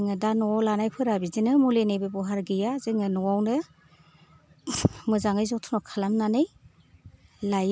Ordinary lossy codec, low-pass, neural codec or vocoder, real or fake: none; none; none; real